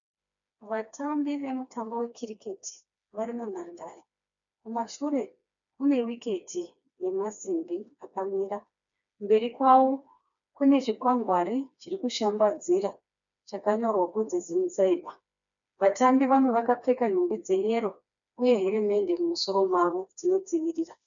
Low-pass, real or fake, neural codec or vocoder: 7.2 kHz; fake; codec, 16 kHz, 2 kbps, FreqCodec, smaller model